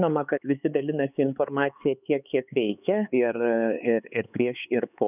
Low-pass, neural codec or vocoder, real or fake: 3.6 kHz; codec, 16 kHz, 4 kbps, X-Codec, HuBERT features, trained on LibriSpeech; fake